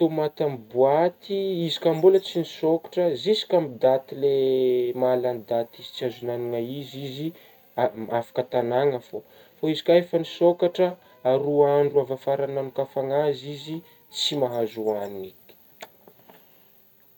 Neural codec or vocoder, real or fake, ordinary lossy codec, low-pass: none; real; none; 19.8 kHz